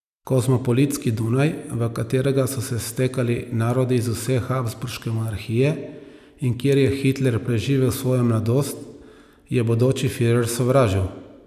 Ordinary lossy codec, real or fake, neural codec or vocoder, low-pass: none; real; none; 14.4 kHz